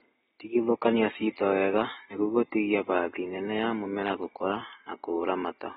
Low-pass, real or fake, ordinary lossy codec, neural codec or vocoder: 19.8 kHz; real; AAC, 16 kbps; none